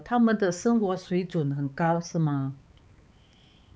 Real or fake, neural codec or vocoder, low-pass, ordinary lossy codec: fake; codec, 16 kHz, 4 kbps, X-Codec, HuBERT features, trained on balanced general audio; none; none